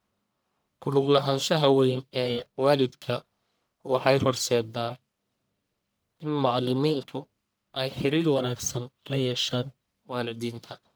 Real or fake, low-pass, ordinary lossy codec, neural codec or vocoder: fake; none; none; codec, 44.1 kHz, 1.7 kbps, Pupu-Codec